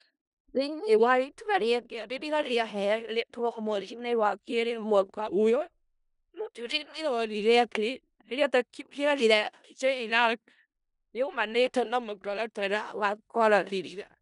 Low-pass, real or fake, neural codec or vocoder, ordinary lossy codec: 10.8 kHz; fake; codec, 16 kHz in and 24 kHz out, 0.4 kbps, LongCat-Audio-Codec, four codebook decoder; none